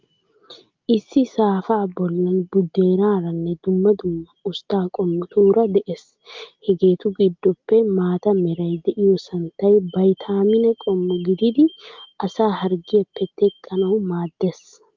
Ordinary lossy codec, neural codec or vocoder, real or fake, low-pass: Opus, 24 kbps; none; real; 7.2 kHz